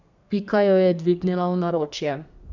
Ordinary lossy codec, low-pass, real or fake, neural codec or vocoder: none; 7.2 kHz; fake; codec, 32 kHz, 1.9 kbps, SNAC